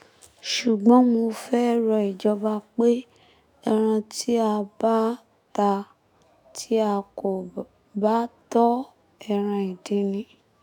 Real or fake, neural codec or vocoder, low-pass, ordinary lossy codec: fake; autoencoder, 48 kHz, 128 numbers a frame, DAC-VAE, trained on Japanese speech; none; none